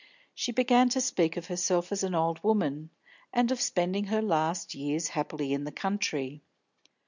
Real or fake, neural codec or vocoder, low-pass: real; none; 7.2 kHz